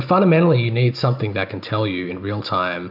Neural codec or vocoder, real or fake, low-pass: none; real; 5.4 kHz